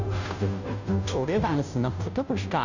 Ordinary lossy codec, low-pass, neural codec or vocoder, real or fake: none; 7.2 kHz; codec, 16 kHz, 0.5 kbps, FunCodec, trained on Chinese and English, 25 frames a second; fake